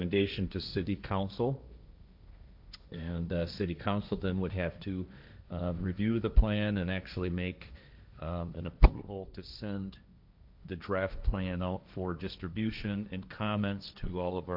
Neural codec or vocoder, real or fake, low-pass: codec, 16 kHz, 1.1 kbps, Voila-Tokenizer; fake; 5.4 kHz